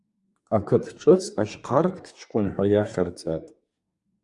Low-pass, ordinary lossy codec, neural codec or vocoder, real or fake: 10.8 kHz; Opus, 64 kbps; codec, 24 kHz, 1 kbps, SNAC; fake